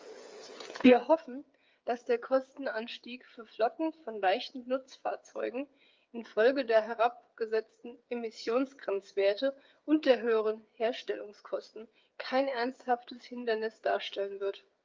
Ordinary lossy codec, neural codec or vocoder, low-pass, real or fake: Opus, 32 kbps; codec, 16 kHz, 8 kbps, FreqCodec, smaller model; 7.2 kHz; fake